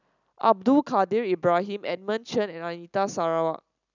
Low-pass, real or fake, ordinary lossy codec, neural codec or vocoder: 7.2 kHz; real; none; none